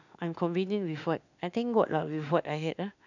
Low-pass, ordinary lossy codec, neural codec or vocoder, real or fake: 7.2 kHz; none; autoencoder, 48 kHz, 32 numbers a frame, DAC-VAE, trained on Japanese speech; fake